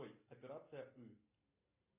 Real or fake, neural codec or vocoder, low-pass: real; none; 3.6 kHz